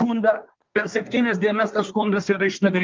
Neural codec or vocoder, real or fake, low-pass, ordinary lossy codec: codec, 24 kHz, 1 kbps, SNAC; fake; 7.2 kHz; Opus, 24 kbps